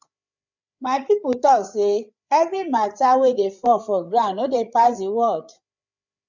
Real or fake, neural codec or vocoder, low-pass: fake; codec, 16 kHz, 8 kbps, FreqCodec, larger model; 7.2 kHz